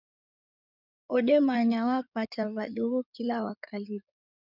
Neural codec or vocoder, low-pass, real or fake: codec, 16 kHz in and 24 kHz out, 2.2 kbps, FireRedTTS-2 codec; 5.4 kHz; fake